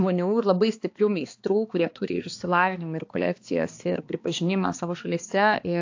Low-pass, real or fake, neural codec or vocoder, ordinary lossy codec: 7.2 kHz; fake; codec, 16 kHz, 2 kbps, X-Codec, HuBERT features, trained on balanced general audio; AAC, 48 kbps